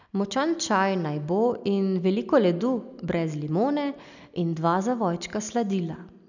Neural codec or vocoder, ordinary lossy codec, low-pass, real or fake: none; none; 7.2 kHz; real